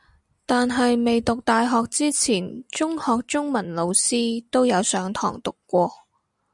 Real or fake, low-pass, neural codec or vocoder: real; 10.8 kHz; none